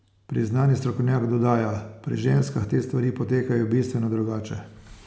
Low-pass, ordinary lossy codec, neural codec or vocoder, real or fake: none; none; none; real